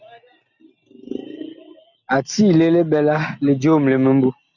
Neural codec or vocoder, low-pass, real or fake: none; 7.2 kHz; real